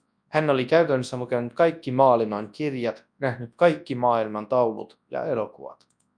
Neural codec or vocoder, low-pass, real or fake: codec, 24 kHz, 0.9 kbps, WavTokenizer, large speech release; 9.9 kHz; fake